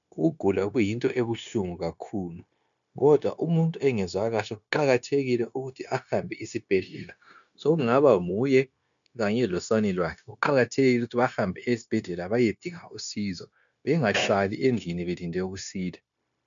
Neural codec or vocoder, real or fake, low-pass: codec, 16 kHz, 0.9 kbps, LongCat-Audio-Codec; fake; 7.2 kHz